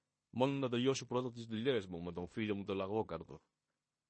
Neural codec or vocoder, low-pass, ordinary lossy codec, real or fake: codec, 16 kHz in and 24 kHz out, 0.9 kbps, LongCat-Audio-Codec, fine tuned four codebook decoder; 9.9 kHz; MP3, 32 kbps; fake